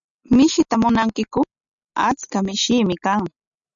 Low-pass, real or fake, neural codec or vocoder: 7.2 kHz; real; none